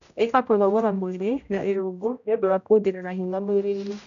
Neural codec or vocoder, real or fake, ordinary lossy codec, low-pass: codec, 16 kHz, 0.5 kbps, X-Codec, HuBERT features, trained on general audio; fake; none; 7.2 kHz